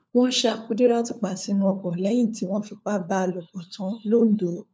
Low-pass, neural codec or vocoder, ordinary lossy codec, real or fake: none; codec, 16 kHz, 4 kbps, FunCodec, trained on LibriTTS, 50 frames a second; none; fake